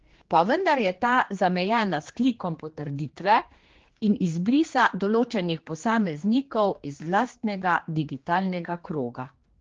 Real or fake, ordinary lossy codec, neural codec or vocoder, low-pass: fake; Opus, 16 kbps; codec, 16 kHz, 2 kbps, X-Codec, HuBERT features, trained on general audio; 7.2 kHz